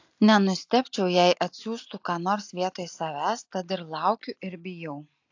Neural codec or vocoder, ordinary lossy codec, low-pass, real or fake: none; AAC, 48 kbps; 7.2 kHz; real